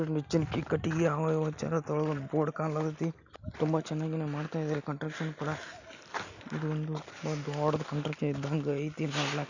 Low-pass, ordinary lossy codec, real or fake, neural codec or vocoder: 7.2 kHz; none; real; none